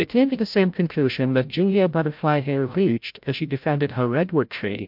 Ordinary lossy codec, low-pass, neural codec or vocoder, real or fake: MP3, 48 kbps; 5.4 kHz; codec, 16 kHz, 0.5 kbps, FreqCodec, larger model; fake